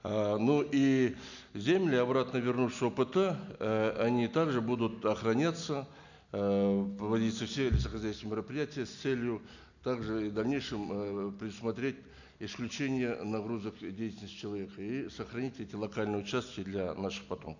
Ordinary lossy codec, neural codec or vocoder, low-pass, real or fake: none; none; 7.2 kHz; real